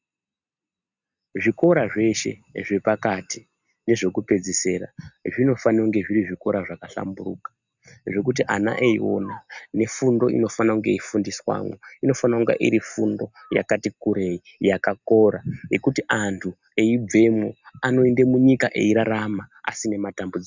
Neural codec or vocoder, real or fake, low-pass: none; real; 7.2 kHz